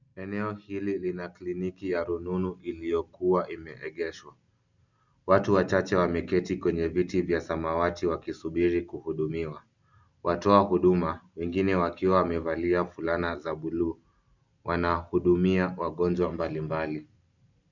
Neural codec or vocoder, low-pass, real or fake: none; 7.2 kHz; real